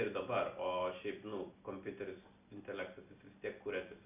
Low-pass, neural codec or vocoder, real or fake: 3.6 kHz; none; real